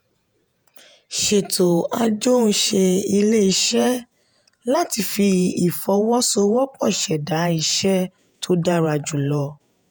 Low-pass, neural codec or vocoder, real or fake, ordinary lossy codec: none; vocoder, 48 kHz, 128 mel bands, Vocos; fake; none